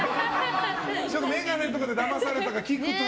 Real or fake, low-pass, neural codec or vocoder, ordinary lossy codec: real; none; none; none